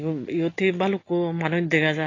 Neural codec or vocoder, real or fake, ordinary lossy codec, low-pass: none; real; AAC, 32 kbps; 7.2 kHz